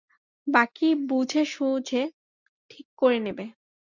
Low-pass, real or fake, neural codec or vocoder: 7.2 kHz; real; none